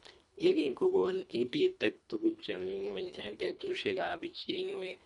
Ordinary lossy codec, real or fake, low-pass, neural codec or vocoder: none; fake; 10.8 kHz; codec, 24 kHz, 1.5 kbps, HILCodec